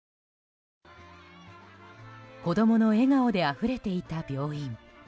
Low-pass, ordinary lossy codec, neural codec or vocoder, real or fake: none; none; none; real